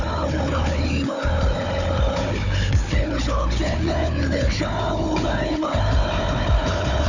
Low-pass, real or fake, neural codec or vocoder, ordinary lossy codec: 7.2 kHz; fake; codec, 16 kHz, 4 kbps, FunCodec, trained on Chinese and English, 50 frames a second; none